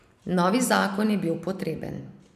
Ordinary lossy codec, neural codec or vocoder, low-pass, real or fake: none; none; 14.4 kHz; real